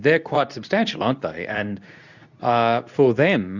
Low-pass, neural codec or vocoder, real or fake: 7.2 kHz; codec, 24 kHz, 0.9 kbps, WavTokenizer, medium speech release version 2; fake